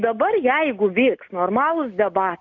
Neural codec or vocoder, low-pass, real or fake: none; 7.2 kHz; real